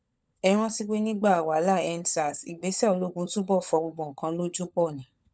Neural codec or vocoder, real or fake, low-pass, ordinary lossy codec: codec, 16 kHz, 16 kbps, FunCodec, trained on LibriTTS, 50 frames a second; fake; none; none